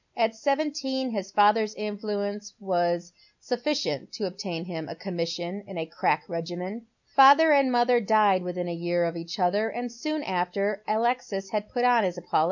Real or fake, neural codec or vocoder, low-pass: real; none; 7.2 kHz